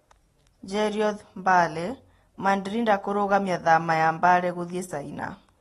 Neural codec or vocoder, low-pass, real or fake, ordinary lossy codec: none; 19.8 kHz; real; AAC, 32 kbps